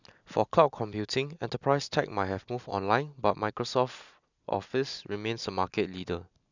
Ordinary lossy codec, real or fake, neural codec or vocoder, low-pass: none; real; none; 7.2 kHz